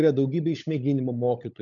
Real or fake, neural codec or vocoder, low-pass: fake; codec, 16 kHz, 16 kbps, FunCodec, trained on LibriTTS, 50 frames a second; 7.2 kHz